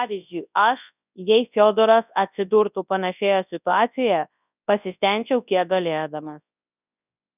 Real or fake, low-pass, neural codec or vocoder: fake; 3.6 kHz; codec, 24 kHz, 0.9 kbps, WavTokenizer, large speech release